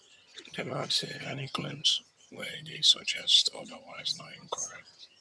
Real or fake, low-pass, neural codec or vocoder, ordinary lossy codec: fake; none; vocoder, 22.05 kHz, 80 mel bands, HiFi-GAN; none